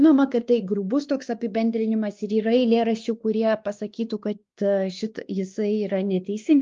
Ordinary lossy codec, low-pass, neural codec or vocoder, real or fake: Opus, 32 kbps; 7.2 kHz; codec, 16 kHz, 2 kbps, X-Codec, WavLM features, trained on Multilingual LibriSpeech; fake